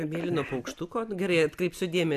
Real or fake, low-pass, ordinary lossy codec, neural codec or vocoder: real; 14.4 kHz; AAC, 96 kbps; none